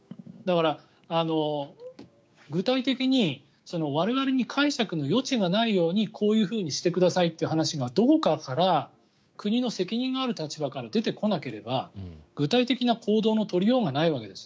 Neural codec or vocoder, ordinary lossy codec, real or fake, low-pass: codec, 16 kHz, 6 kbps, DAC; none; fake; none